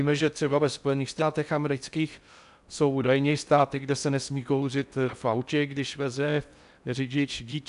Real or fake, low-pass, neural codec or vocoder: fake; 10.8 kHz; codec, 16 kHz in and 24 kHz out, 0.6 kbps, FocalCodec, streaming, 4096 codes